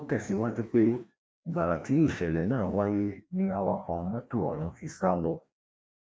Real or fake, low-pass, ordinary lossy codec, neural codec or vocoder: fake; none; none; codec, 16 kHz, 1 kbps, FreqCodec, larger model